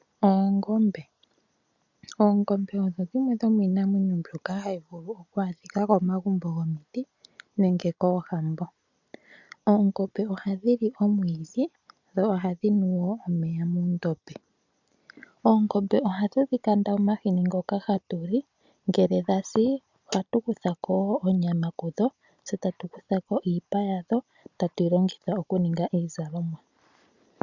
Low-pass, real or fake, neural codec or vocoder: 7.2 kHz; real; none